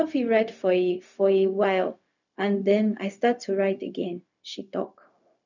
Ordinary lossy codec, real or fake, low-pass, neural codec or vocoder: none; fake; 7.2 kHz; codec, 16 kHz, 0.4 kbps, LongCat-Audio-Codec